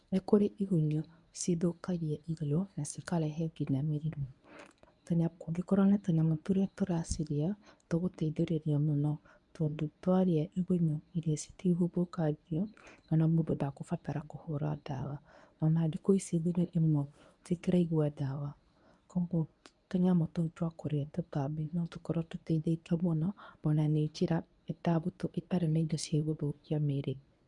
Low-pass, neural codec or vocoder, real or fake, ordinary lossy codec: 10.8 kHz; codec, 24 kHz, 0.9 kbps, WavTokenizer, medium speech release version 1; fake; none